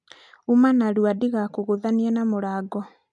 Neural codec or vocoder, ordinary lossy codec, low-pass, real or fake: none; none; 10.8 kHz; real